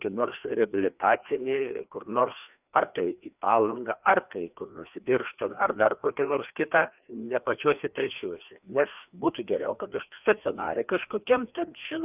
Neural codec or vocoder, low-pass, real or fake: codec, 16 kHz, 2 kbps, FreqCodec, larger model; 3.6 kHz; fake